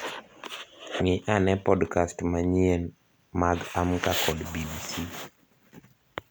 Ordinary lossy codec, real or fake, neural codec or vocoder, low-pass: none; real; none; none